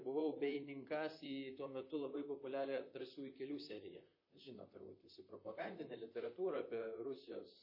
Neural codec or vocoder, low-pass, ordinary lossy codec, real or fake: vocoder, 44.1 kHz, 128 mel bands, Pupu-Vocoder; 5.4 kHz; MP3, 24 kbps; fake